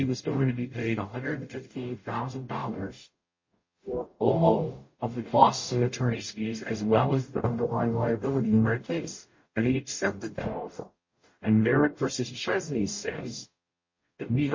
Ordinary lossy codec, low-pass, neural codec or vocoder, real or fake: MP3, 32 kbps; 7.2 kHz; codec, 44.1 kHz, 0.9 kbps, DAC; fake